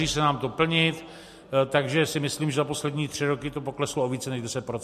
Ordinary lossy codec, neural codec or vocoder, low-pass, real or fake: MP3, 64 kbps; none; 14.4 kHz; real